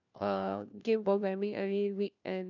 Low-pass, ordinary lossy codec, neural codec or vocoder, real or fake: 7.2 kHz; none; codec, 16 kHz, 1 kbps, FunCodec, trained on LibriTTS, 50 frames a second; fake